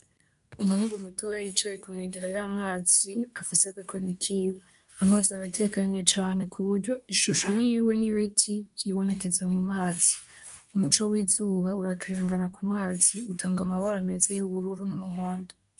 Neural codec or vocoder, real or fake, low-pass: codec, 24 kHz, 1 kbps, SNAC; fake; 10.8 kHz